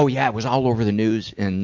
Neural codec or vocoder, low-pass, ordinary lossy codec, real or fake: none; 7.2 kHz; MP3, 48 kbps; real